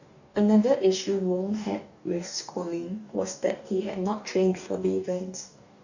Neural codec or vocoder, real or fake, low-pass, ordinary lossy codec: codec, 44.1 kHz, 2.6 kbps, DAC; fake; 7.2 kHz; none